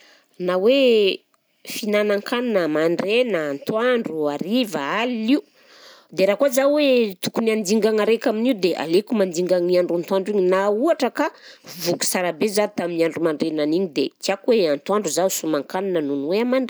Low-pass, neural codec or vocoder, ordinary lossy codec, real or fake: none; none; none; real